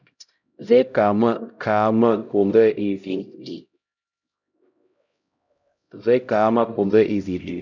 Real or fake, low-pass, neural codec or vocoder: fake; 7.2 kHz; codec, 16 kHz, 0.5 kbps, X-Codec, HuBERT features, trained on LibriSpeech